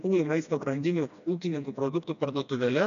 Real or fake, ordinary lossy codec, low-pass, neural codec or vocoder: fake; MP3, 96 kbps; 7.2 kHz; codec, 16 kHz, 1 kbps, FreqCodec, smaller model